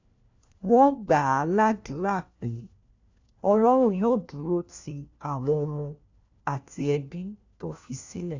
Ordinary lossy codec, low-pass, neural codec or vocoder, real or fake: AAC, 48 kbps; 7.2 kHz; codec, 16 kHz, 1 kbps, FunCodec, trained on LibriTTS, 50 frames a second; fake